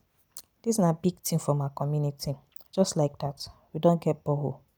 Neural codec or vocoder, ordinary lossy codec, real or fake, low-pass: none; none; real; none